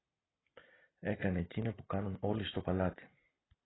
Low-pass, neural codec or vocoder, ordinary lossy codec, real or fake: 7.2 kHz; none; AAC, 16 kbps; real